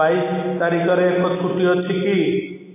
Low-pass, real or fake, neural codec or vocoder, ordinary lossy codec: 3.6 kHz; real; none; none